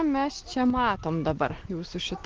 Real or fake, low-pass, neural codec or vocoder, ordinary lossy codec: real; 7.2 kHz; none; Opus, 16 kbps